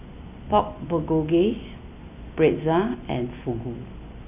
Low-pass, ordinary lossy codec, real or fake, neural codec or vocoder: 3.6 kHz; none; real; none